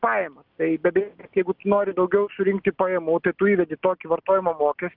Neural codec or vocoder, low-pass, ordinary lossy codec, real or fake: none; 5.4 kHz; Opus, 24 kbps; real